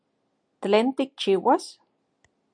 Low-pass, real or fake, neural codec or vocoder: 9.9 kHz; real; none